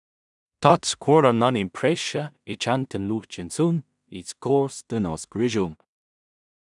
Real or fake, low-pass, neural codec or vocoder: fake; 10.8 kHz; codec, 16 kHz in and 24 kHz out, 0.4 kbps, LongCat-Audio-Codec, two codebook decoder